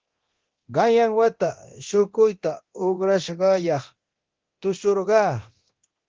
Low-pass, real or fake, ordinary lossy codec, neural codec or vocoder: 7.2 kHz; fake; Opus, 16 kbps; codec, 24 kHz, 0.9 kbps, DualCodec